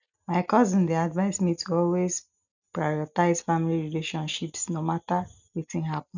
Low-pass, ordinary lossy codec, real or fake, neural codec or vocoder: 7.2 kHz; none; real; none